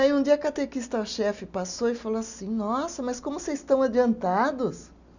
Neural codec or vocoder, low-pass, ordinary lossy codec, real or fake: none; 7.2 kHz; none; real